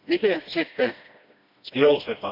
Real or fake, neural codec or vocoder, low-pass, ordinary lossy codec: fake; codec, 16 kHz, 1 kbps, FreqCodec, smaller model; 5.4 kHz; MP3, 48 kbps